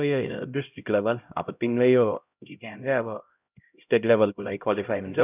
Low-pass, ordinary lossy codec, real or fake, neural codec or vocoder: 3.6 kHz; none; fake; codec, 16 kHz, 0.5 kbps, X-Codec, HuBERT features, trained on LibriSpeech